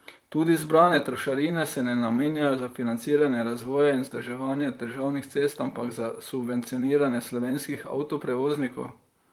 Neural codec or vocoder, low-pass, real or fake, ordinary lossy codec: vocoder, 44.1 kHz, 128 mel bands, Pupu-Vocoder; 19.8 kHz; fake; Opus, 32 kbps